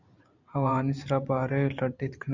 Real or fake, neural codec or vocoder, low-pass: fake; vocoder, 44.1 kHz, 128 mel bands every 512 samples, BigVGAN v2; 7.2 kHz